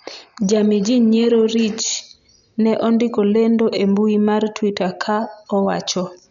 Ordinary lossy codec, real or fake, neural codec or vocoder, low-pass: none; real; none; 7.2 kHz